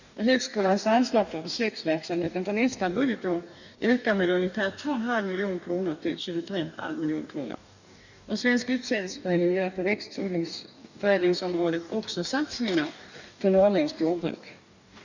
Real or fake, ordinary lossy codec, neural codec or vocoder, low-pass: fake; none; codec, 44.1 kHz, 2.6 kbps, DAC; 7.2 kHz